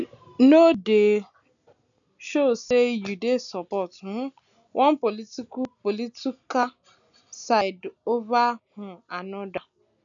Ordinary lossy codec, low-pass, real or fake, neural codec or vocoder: none; 7.2 kHz; real; none